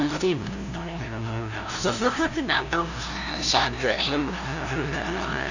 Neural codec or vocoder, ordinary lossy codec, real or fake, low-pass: codec, 16 kHz, 0.5 kbps, FunCodec, trained on LibriTTS, 25 frames a second; none; fake; 7.2 kHz